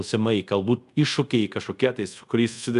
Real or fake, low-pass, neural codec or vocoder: fake; 10.8 kHz; codec, 24 kHz, 0.5 kbps, DualCodec